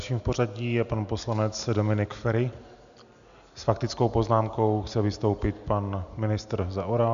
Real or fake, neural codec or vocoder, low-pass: real; none; 7.2 kHz